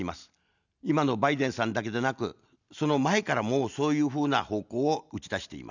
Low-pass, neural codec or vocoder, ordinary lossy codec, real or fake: 7.2 kHz; none; none; real